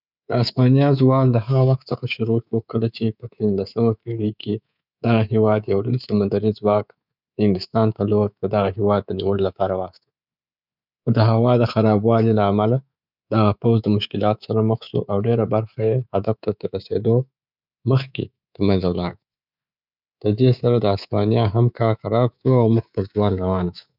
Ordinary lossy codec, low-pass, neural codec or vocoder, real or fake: none; 5.4 kHz; codec, 24 kHz, 3.1 kbps, DualCodec; fake